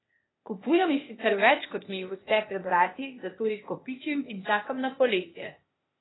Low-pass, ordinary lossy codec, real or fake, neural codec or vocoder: 7.2 kHz; AAC, 16 kbps; fake; codec, 16 kHz, 0.8 kbps, ZipCodec